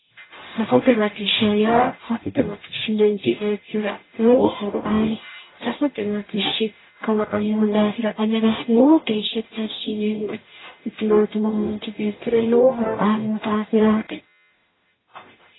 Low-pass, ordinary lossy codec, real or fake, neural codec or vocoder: 7.2 kHz; AAC, 16 kbps; fake; codec, 44.1 kHz, 0.9 kbps, DAC